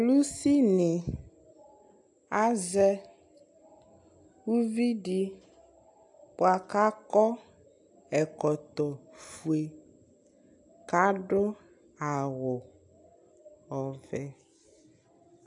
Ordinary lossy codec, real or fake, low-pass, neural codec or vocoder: AAC, 64 kbps; real; 10.8 kHz; none